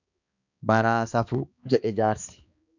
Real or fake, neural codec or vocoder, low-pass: fake; codec, 16 kHz, 2 kbps, X-Codec, HuBERT features, trained on balanced general audio; 7.2 kHz